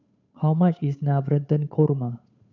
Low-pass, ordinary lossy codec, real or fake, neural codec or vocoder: 7.2 kHz; none; fake; codec, 16 kHz, 8 kbps, FunCodec, trained on Chinese and English, 25 frames a second